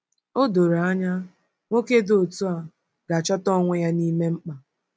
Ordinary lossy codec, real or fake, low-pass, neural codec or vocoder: none; real; none; none